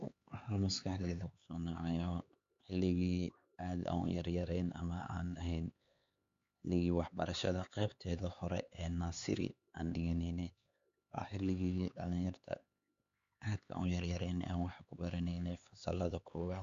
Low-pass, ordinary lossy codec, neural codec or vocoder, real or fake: 7.2 kHz; Opus, 64 kbps; codec, 16 kHz, 4 kbps, X-Codec, HuBERT features, trained on LibriSpeech; fake